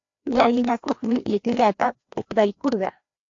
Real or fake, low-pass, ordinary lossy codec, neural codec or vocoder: fake; 7.2 kHz; AAC, 48 kbps; codec, 16 kHz, 1 kbps, FreqCodec, larger model